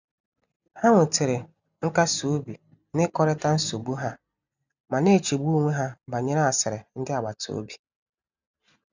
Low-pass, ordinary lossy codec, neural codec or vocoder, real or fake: 7.2 kHz; none; none; real